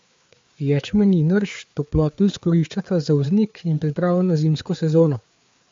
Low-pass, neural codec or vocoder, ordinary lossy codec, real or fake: 7.2 kHz; codec, 16 kHz, 4 kbps, FreqCodec, larger model; MP3, 48 kbps; fake